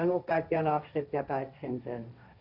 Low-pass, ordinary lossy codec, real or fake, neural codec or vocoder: 5.4 kHz; none; fake; codec, 16 kHz, 1.1 kbps, Voila-Tokenizer